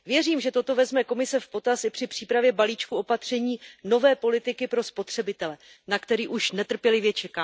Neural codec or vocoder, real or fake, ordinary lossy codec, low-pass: none; real; none; none